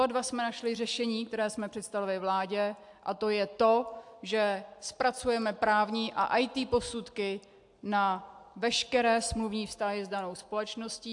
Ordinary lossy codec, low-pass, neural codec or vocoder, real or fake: AAC, 64 kbps; 10.8 kHz; none; real